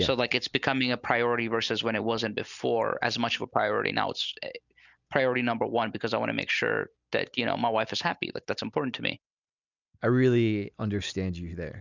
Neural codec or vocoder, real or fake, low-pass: none; real; 7.2 kHz